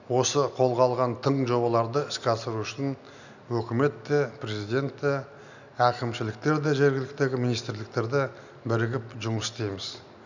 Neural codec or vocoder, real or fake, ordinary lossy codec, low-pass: none; real; none; 7.2 kHz